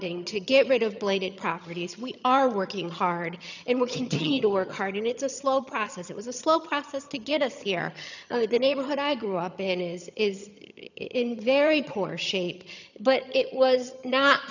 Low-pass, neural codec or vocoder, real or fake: 7.2 kHz; vocoder, 22.05 kHz, 80 mel bands, HiFi-GAN; fake